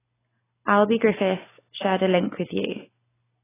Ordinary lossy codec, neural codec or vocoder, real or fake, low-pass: AAC, 16 kbps; none; real; 3.6 kHz